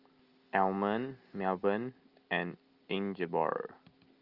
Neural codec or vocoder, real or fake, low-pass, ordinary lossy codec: none; real; 5.4 kHz; Opus, 24 kbps